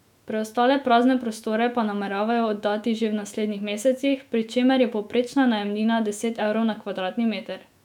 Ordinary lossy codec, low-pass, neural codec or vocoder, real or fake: none; 19.8 kHz; autoencoder, 48 kHz, 128 numbers a frame, DAC-VAE, trained on Japanese speech; fake